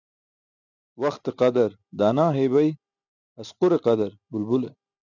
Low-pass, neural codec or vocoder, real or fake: 7.2 kHz; none; real